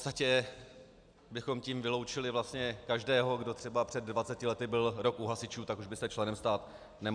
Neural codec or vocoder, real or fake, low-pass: none; real; 9.9 kHz